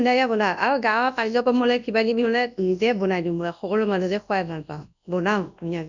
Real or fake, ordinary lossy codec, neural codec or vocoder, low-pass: fake; none; codec, 24 kHz, 0.9 kbps, WavTokenizer, large speech release; 7.2 kHz